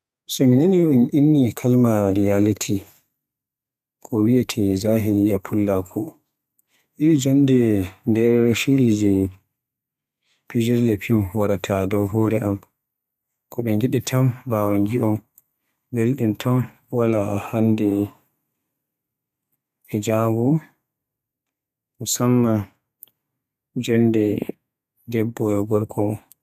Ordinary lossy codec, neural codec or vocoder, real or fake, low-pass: none; codec, 32 kHz, 1.9 kbps, SNAC; fake; 14.4 kHz